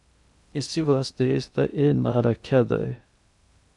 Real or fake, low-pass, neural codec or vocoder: fake; 10.8 kHz; codec, 16 kHz in and 24 kHz out, 0.6 kbps, FocalCodec, streaming, 2048 codes